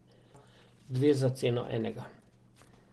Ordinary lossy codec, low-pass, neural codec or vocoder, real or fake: Opus, 16 kbps; 10.8 kHz; none; real